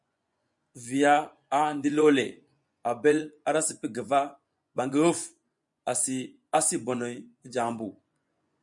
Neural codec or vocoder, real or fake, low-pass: vocoder, 24 kHz, 100 mel bands, Vocos; fake; 10.8 kHz